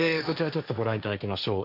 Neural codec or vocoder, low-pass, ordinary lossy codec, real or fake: codec, 16 kHz, 1.1 kbps, Voila-Tokenizer; 5.4 kHz; none; fake